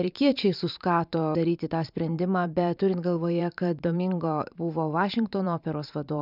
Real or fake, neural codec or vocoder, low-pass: fake; vocoder, 44.1 kHz, 128 mel bands every 512 samples, BigVGAN v2; 5.4 kHz